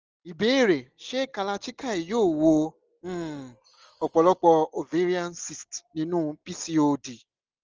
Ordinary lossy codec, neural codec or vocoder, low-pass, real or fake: Opus, 24 kbps; none; 7.2 kHz; real